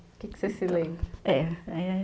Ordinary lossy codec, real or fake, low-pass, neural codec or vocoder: none; real; none; none